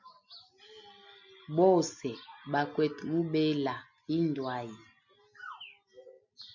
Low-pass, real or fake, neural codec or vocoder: 7.2 kHz; real; none